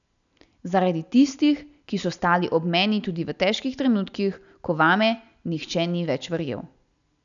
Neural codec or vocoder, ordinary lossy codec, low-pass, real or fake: none; none; 7.2 kHz; real